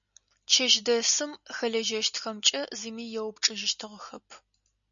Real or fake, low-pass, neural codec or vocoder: real; 7.2 kHz; none